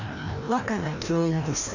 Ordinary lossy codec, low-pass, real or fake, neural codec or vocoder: none; 7.2 kHz; fake; codec, 16 kHz, 1 kbps, FreqCodec, larger model